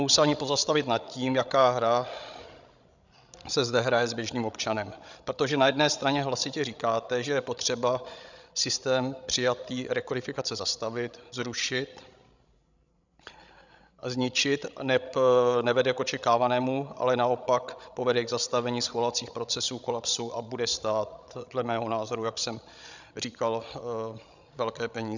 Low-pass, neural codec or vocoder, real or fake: 7.2 kHz; codec, 16 kHz, 16 kbps, FreqCodec, larger model; fake